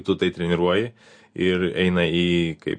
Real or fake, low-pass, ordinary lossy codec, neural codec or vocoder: real; 9.9 kHz; MP3, 48 kbps; none